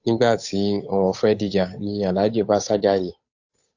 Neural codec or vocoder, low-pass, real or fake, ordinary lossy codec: codec, 16 kHz, 8 kbps, FunCodec, trained on Chinese and English, 25 frames a second; 7.2 kHz; fake; AAC, 48 kbps